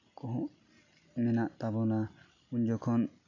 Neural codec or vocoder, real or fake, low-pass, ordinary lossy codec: none; real; 7.2 kHz; none